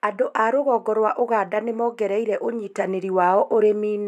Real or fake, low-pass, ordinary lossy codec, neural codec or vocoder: real; 14.4 kHz; none; none